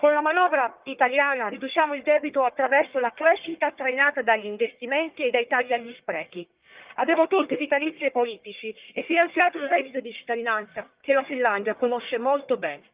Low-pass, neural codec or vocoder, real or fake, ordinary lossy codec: 3.6 kHz; codec, 44.1 kHz, 1.7 kbps, Pupu-Codec; fake; Opus, 32 kbps